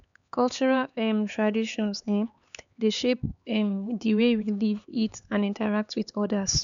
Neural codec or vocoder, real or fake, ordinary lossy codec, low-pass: codec, 16 kHz, 4 kbps, X-Codec, HuBERT features, trained on LibriSpeech; fake; MP3, 96 kbps; 7.2 kHz